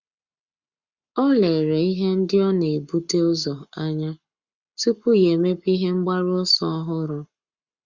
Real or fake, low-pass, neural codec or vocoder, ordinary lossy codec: fake; 7.2 kHz; codec, 44.1 kHz, 7.8 kbps, Pupu-Codec; Opus, 64 kbps